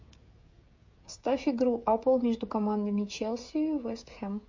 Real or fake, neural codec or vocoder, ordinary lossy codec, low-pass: fake; codec, 16 kHz, 16 kbps, FreqCodec, smaller model; MP3, 48 kbps; 7.2 kHz